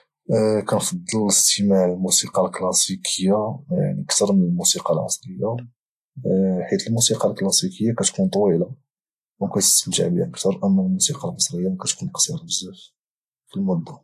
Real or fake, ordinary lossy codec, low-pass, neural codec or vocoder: real; AAC, 64 kbps; 14.4 kHz; none